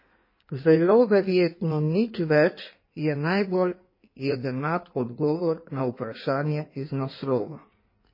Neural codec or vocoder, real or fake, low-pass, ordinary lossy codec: codec, 16 kHz in and 24 kHz out, 1.1 kbps, FireRedTTS-2 codec; fake; 5.4 kHz; MP3, 24 kbps